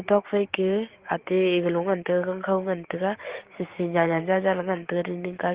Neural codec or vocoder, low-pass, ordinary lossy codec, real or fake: codec, 16 kHz, 6 kbps, DAC; 3.6 kHz; Opus, 16 kbps; fake